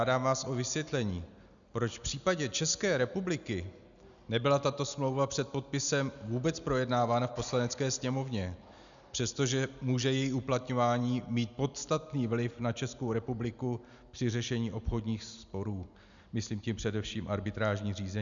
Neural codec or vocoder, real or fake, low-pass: none; real; 7.2 kHz